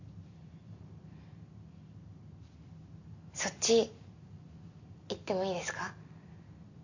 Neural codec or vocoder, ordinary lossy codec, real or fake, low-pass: none; none; real; 7.2 kHz